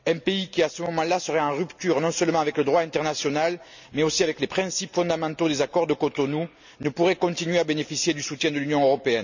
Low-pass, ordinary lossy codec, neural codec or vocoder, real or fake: 7.2 kHz; none; none; real